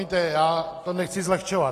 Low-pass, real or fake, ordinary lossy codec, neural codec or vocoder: 14.4 kHz; real; AAC, 48 kbps; none